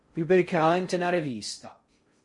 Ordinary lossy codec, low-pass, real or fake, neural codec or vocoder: MP3, 48 kbps; 10.8 kHz; fake; codec, 16 kHz in and 24 kHz out, 0.6 kbps, FocalCodec, streaming, 4096 codes